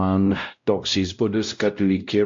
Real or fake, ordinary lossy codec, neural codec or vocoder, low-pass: fake; MP3, 48 kbps; codec, 16 kHz, 0.5 kbps, X-Codec, WavLM features, trained on Multilingual LibriSpeech; 7.2 kHz